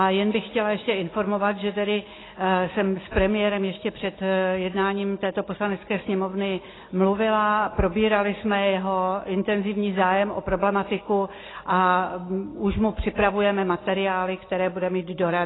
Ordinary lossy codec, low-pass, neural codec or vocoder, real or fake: AAC, 16 kbps; 7.2 kHz; none; real